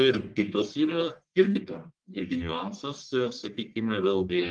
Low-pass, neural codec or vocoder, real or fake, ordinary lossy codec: 9.9 kHz; codec, 44.1 kHz, 1.7 kbps, Pupu-Codec; fake; Opus, 24 kbps